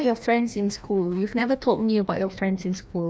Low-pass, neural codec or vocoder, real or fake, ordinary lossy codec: none; codec, 16 kHz, 1 kbps, FreqCodec, larger model; fake; none